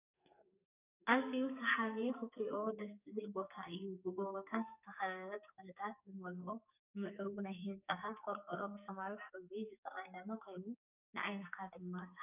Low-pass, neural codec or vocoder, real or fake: 3.6 kHz; codec, 44.1 kHz, 2.6 kbps, SNAC; fake